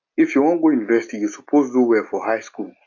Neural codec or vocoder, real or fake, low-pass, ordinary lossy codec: none; real; 7.2 kHz; AAC, 48 kbps